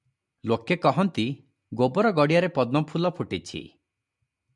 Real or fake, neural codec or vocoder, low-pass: real; none; 10.8 kHz